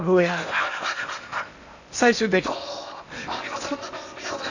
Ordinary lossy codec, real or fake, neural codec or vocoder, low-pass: none; fake; codec, 16 kHz in and 24 kHz out, 0.8 kbps, FocalCodec, streaming, 65536 codes; 7.2 kHz